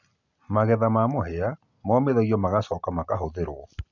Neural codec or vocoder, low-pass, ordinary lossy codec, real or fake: none; 7.2 kHz; none; real